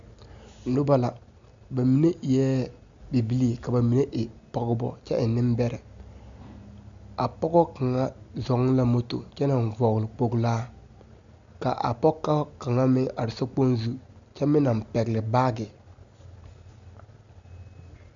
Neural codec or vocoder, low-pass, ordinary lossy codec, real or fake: none; 7.2 kHz; Opus, 64 kbps; real